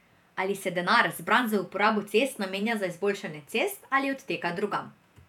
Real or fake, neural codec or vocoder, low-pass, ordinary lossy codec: fake; vocoder, 48 kHz, 128 mel bands, Vocos; 19.8 kHz; none